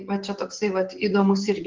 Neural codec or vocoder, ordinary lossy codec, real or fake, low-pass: vocoder, 44.1 kHz, 128 mel bands, Pupu-Vocoder; Opus, 16 kbps; fake; 7.2 kHz